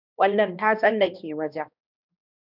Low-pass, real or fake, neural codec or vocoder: 5.4 kHz; fake; codec, 16 kHz, 1 kbps, X-Codec, HuBERT features, trained on balanced general audio